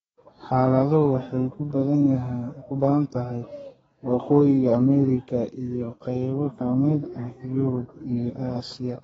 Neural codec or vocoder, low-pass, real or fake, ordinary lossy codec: codec, 32 kHz, 1.9 kbps, SNAC; 14.4 kHz; fake; AAC, 24 kbps